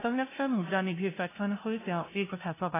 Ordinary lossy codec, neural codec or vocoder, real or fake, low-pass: AAC, 16 kbps; codec, 16 kHz, 0.5 kbps, FunCodec, trained on LibriTTS, 25 frames a second; fake; 3.6 kHz